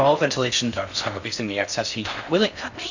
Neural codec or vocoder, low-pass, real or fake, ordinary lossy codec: codec, 16 kHz in and 24 kHz out, 0.6 kbps, FocalCodec, streaming, 4096 codes; 7.2 kHz; fake; Opus, 64 kbps